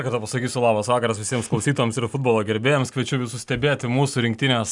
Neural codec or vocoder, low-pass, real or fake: none; 10.8 kHz; real